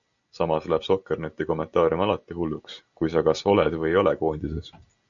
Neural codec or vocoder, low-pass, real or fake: none; 7.2 kHz; real